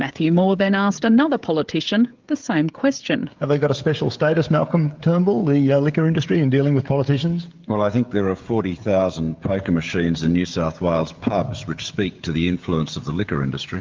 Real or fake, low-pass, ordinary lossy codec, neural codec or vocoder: fake; 7.2 kHz; Opus, 24 kbps; codec, 16 kHz, 16 kbps, FreqCodec, smaller model